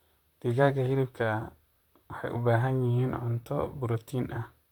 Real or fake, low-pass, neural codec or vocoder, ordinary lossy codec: fake; 19.8 kHz; vocoder, 44.1 kHz, 128 mel bands, Pupu-Vocoder; none